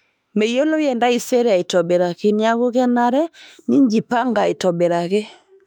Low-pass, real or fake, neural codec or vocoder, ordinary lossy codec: 19.8 kHz; fake; autoencoder, 48 kHz, 32 numbers a frame, DAC-VAE, trained on Japanese speech; none